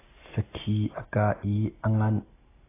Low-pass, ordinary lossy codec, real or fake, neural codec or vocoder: 3.6 kHz; AAC, 16 kbps; real; none